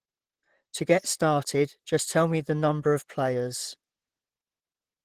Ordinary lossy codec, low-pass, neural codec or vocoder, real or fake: Opus, 16 kbps; 14.4 kHz; vocoder, 44.1 kHz, 128 mel bands, Pupu-Vocoder; fake